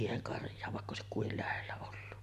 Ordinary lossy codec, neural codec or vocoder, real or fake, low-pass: none; none; real; 14.4 kHz